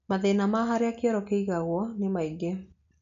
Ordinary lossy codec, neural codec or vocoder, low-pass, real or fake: none; none; 7.2 kHz; real